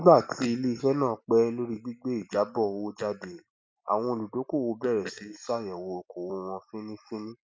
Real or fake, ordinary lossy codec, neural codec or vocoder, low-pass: real; none; none; none